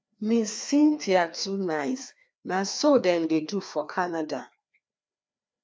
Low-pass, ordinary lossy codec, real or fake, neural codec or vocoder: none; none; fake; codec, 16 kHz, 2 kbps, FreqCodec, larger model